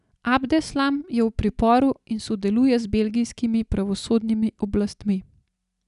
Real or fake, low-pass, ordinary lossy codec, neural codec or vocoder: real; 10.8 kHz; none; none